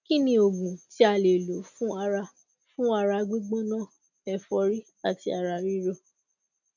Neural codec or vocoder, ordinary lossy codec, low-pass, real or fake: none; none; 7.2 kHz; real